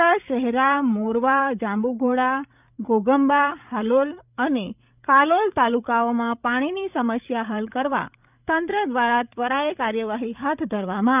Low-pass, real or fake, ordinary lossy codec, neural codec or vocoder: 3.6 kHz; fake; none; codec, 16 kHz, 8 kbps, FreqCodec, larger model